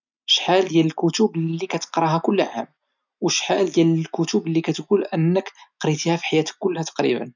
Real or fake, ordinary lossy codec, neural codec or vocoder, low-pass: real; none; none; 7.2 kHz